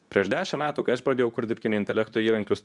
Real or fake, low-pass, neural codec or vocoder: fake; 10.8 kHz; codec, 24 kHz, 0.9 kbps, WavTokenizer, medium speech release version 2